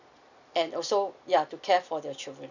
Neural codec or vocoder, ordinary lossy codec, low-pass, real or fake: none; none; 7.2 kHz; real